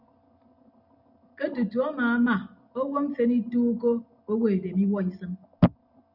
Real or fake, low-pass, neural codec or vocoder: real; 5.4 kHz; none